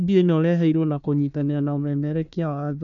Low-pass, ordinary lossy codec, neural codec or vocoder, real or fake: 7.2 kHz; none; codec, 16 kHz, 1 kbps, FunCodec, trained on Chinese and English, 50 frames a second; fake